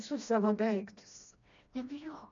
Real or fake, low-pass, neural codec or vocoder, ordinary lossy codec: fake; 7.2 kHz; codec, 16 kHz, 1 kbps, FreqCodec, smaller model; MP3, 64 kbps